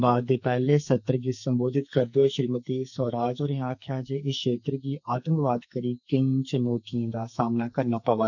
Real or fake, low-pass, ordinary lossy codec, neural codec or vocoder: fake; 7.2 kHz; none; codec, 44.1 kHz, 2.6 kbps, SNAC